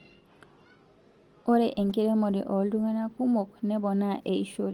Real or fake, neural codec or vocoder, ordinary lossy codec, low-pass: real; none; Opus, 24 kbps; 19.8 kHz